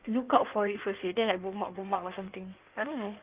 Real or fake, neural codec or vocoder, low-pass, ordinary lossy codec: fake; codec, 16 kHz in and 24 kHz out, 1.1 kbps, FireRedTTS-2 codec; 3.6 kHz; Opus, 32 kbps